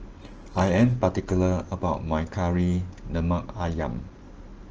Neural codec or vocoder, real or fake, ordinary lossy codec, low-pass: none; real; Opus, 16 kbps; 7.2 kHz